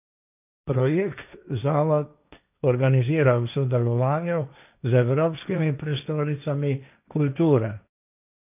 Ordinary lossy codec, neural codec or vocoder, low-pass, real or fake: none; codec, 16 kHz, 1.1 kbps, Voila-Tokenizer; 3.6 kHz; fake